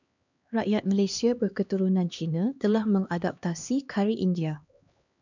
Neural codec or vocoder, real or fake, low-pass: codec, 16 kHz, 2 kbps, X-Codec, HuBERT features, trained on LibriSpeech; fake; 7.2 kHz